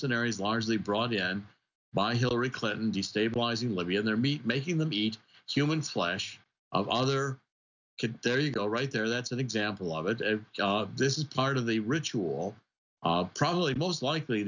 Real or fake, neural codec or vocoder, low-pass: real; none; 7.2 kHz